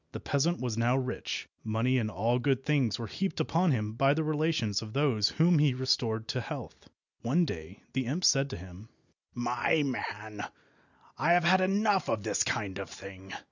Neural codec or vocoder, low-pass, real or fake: none; 7.2 kHz; real